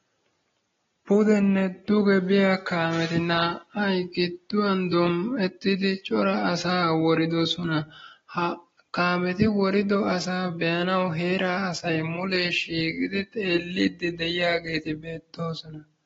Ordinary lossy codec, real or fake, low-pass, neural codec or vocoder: AAC, 24 kbps; real; 7.2 kHz; none